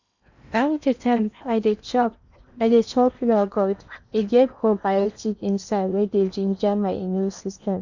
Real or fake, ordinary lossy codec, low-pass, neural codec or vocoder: fake; none; 7.2 kHz; codec, 16 kHz in and 24 kHz out, 0.8 kbps, FocalCodec, streaming, 65536 codes